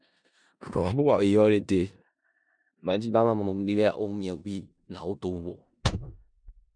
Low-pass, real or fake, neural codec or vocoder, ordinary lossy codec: 9.9 kHz; fake; codec, 16 kHz in and 24 kHz out, 0.4 kbps, LongCat-Audio-Codec, four codebook decoder; MP3, 96 kbps